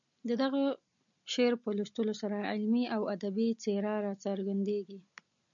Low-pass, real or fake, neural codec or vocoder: 7.2 kHz; real; none